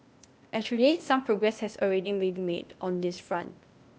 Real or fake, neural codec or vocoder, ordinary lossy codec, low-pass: fake; codec, 16 kHz, 0.8 kbps, ZipCodec; none; none